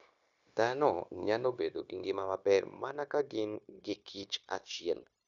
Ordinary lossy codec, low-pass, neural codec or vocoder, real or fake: none; 7.2 kHz; codec, 16 kHz, 0.9 kbps, LongCat-Audio-Codec; fake